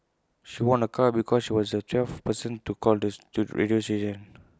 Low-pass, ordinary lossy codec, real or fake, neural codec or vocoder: none; none; real; none